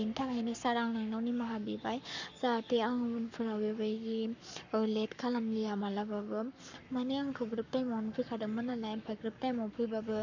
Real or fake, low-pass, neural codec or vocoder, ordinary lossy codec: fake; 7.2 kHz; codec, 44.1 kHz, 7.8 kbps, Pupu-Codec; none